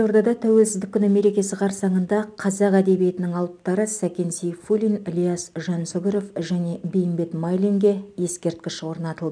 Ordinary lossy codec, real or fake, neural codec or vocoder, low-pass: none; real; none; 9.9 kHz